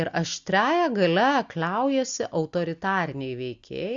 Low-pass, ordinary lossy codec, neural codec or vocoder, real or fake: 7.2 kHz; Opus, 64 kbps; none; real